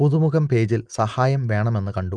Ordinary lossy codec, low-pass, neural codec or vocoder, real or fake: Opus, 32 kbps; 9.9 kHz; none; real